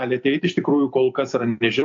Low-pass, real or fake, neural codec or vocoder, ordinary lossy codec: 7.2 kHz; real; none; AAC, 64 kbps